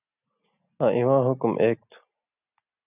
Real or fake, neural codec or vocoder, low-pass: real; none; 3.6 kHz